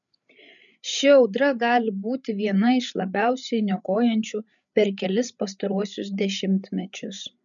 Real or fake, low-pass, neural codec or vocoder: fake; 7.2 kHz; codec, 16 kHz, 8 kbps, FreqCodec, larger model